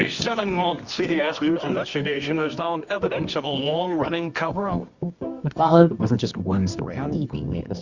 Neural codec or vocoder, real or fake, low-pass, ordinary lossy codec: codec, 24 kHz, 0.9 kbps, WavTokenizer, medium music audio release; fake; 7.2 kHz; Opus, 64 kbps